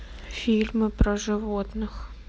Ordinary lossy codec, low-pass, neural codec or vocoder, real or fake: none; none; none; real